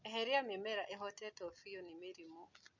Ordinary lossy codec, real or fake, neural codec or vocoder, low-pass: none; real; none; 7.2 kHz